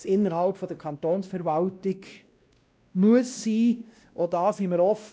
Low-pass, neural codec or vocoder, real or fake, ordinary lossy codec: none; codec, 16 kHz, 1 kbps, X-Codec, WavLM features, trained on Multilingual LibriSpeech; fake; none